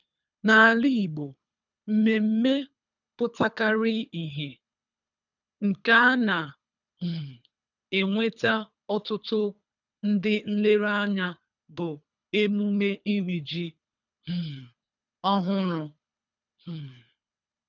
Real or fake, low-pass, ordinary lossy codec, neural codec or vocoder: fake; 7.2 kHz; none; codec, 24 kHz, 3 kbps, HILCodec